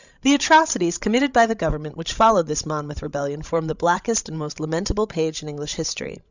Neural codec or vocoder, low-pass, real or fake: codec, 16 kHz, 16 kbps, FreqCodec, larger model; 7.2 kHz; fake